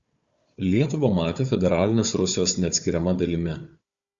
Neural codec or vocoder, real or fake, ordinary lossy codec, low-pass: codec, 16 kHz, 4 kbps, FunCodec, trained on Chinese and English, 50 frames a second; fake; Opus, 64 kbps; 7.2 kHz